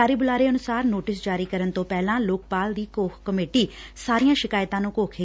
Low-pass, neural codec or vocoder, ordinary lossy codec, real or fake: none; none; none; real